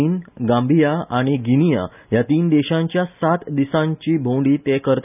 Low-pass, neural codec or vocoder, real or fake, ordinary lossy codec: 3.6 kHz; none; real; none